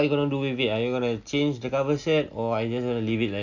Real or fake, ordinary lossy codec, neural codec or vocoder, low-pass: real; none; none; 7.2 kHz